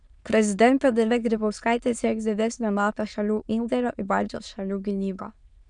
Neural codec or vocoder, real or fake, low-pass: autoencoder, 22.05 kHz, a latent of 192 numbers a frame, VITS, trained on many speakers; fake; 9.9 kHz